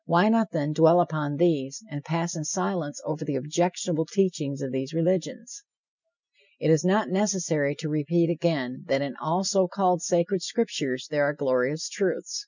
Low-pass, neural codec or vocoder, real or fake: 7.2 kHz; none; real